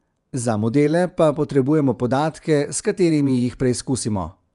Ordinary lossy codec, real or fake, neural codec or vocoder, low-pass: none; fake; vocoder, 24 kHz, 100 mel bands, Vocos; 10.8 kHz